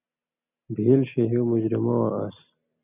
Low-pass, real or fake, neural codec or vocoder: 3.6 kHz; real; none